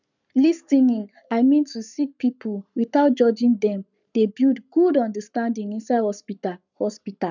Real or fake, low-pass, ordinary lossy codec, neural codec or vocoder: fake; 7.2 kHz; none; codec, 44.1 kHz, 7.8 kbps, Pupu-Codec